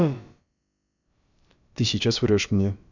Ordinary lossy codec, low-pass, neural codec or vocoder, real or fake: none; 7.2 kHz; codec, 16 kHz, about 1 kbps, DyCAST, with the encoder's durations; fake